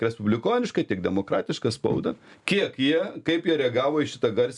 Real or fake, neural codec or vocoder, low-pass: real; none; 10.8 kHz